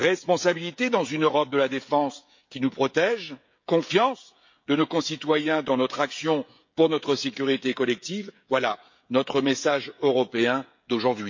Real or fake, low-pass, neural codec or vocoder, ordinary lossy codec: fake; 7.2 kHz; codec, 16 kHz, 16 kbps, FreqCodec, smaller model; MP3, 48 kbps